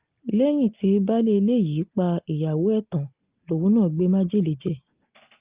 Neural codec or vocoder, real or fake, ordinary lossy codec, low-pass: none; real; Opus, 24 kbps; 3.6 kHz